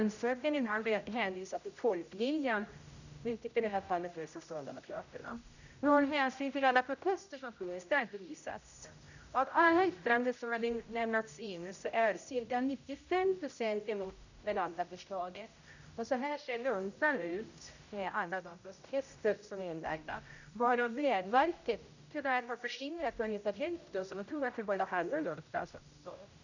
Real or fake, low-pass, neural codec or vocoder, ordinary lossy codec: fake; 7.2 kHz; codec, 16 kHz, 0.5 kbps, X-Codec, HuBERT features, trained on general audio; none